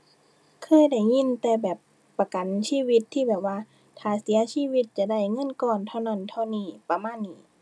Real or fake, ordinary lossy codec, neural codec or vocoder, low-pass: real; none; none; none